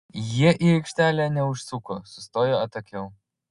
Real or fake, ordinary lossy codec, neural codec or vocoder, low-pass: real; Opus, 64 kbps; none; 10.8 kHz